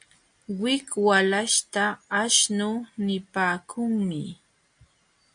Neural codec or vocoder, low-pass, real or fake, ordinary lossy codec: none; 9.9 kHz; real; MP3, 64 kbps